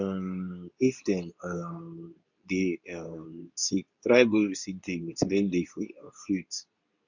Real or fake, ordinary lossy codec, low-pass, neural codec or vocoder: fake; none; 7.2 kHz; codec, 24 kHz, 0.9 kbps, WavTokenizer, medium speech release version 2